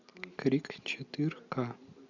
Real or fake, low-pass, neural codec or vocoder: real; 7.2 kHz; none